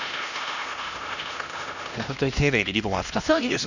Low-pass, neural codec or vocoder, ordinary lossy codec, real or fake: 7.2 kHz; codec, 16 kHz, 1 kbps, X-Codec, HuBERT features, trained on LibriSpeech; none; fake